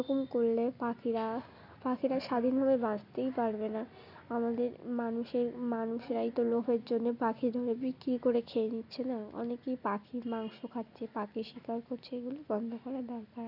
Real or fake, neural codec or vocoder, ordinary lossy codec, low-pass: real; none; none; 5.4 kHz